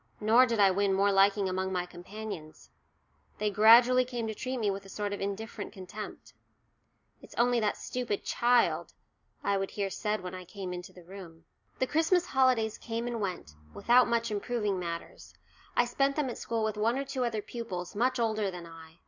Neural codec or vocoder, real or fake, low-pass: none; real; 7.2 kHz